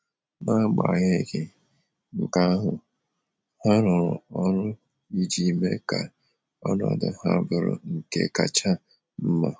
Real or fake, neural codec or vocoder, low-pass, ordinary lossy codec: real; none; none; none